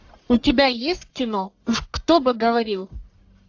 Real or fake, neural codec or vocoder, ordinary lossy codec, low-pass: fake; codec, 44.1 kHz, 1.7 kbps, Pupu-Codec; AAC, 48 kbps; 7.2 kHz